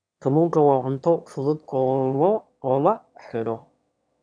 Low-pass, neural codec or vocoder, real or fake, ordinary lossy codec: 9.9 kHz; autoencoder, 22.05 kHz, a latent of 192 numbers a frame, VITS, trained on one speaker; fake; none